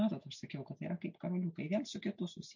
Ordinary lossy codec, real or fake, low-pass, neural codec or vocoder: MP3, 64 kbps; real; 7.2 kHz; none